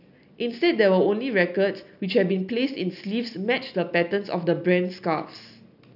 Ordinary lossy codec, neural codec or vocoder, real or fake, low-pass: none; none; real; 5.4 kHz